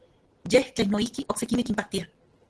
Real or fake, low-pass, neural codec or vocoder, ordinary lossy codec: real; 10.8 kHz; none; Opus, 16 kbps